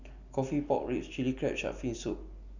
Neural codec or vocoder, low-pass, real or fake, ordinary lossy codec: none; 7.2 kHz; real; none